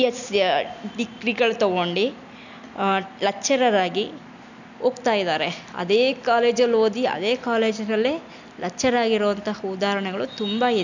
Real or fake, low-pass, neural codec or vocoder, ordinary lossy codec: real; 7.2 kHz; none; none